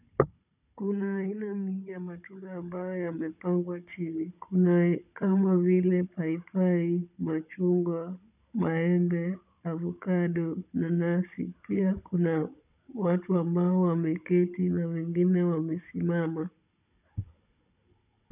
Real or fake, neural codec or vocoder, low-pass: fake; codec, 16 kHz, 16 kbps, FunCodec, trained on Chinese and English, 50 frames a second; 3.6 kHz